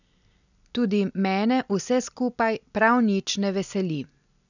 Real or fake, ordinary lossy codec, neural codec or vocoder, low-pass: real; none; none; 7.2 kHz